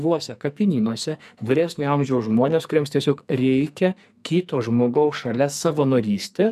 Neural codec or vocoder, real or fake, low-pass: codec, 44.1 kHz, 2.6 kbps, SNAC; fake; 14.4 kHz